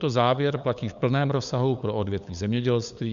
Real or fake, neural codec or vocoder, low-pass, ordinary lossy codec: fake; codec, 16 kHz, 8 kbps, FunCodec, trained on LibriTTS, 25 frames a second; 7.2 kHz; Opus, 64 kbps